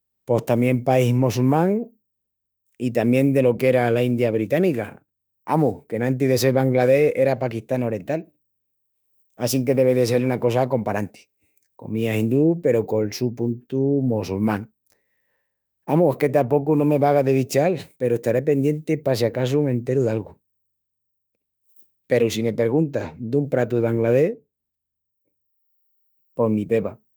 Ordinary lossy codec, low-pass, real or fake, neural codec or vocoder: none; none; fake; autoencoder, 48 kHz, 32 numbers a frame, DAC-VAE, trained on Japanese speech